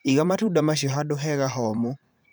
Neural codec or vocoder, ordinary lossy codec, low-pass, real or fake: vocoder, 44.1 kHz, 128 mel bands every 512 samples, BigVGAN v2; none; none; fake